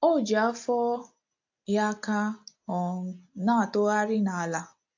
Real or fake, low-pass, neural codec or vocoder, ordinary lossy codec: real; 7.2 kHz; none; AAC, 48 kbps